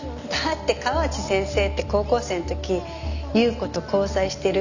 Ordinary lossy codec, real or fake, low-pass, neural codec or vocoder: none; real; 7.2 kHz; none